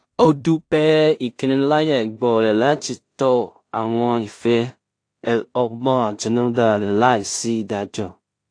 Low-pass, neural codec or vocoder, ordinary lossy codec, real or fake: 9.9 kHz; codec, 16 kHz in and 24 kHz out, 0.4 kbps, LongCat-Audio-Codec, two codebook decoder; AAC, 48 kbps; fake